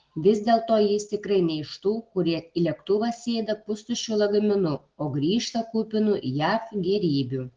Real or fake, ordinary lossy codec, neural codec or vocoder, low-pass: real; Opus, 16 kbps; none; 7.2 kHz